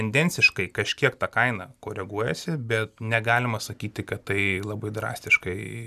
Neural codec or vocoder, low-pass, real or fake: none; 14.4 kHz; real